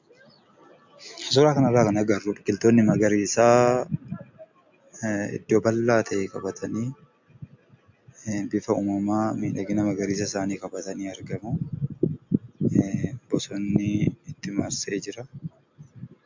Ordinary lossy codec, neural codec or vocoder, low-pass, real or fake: MP3, 64 kbps; none; 7.2 kHz; real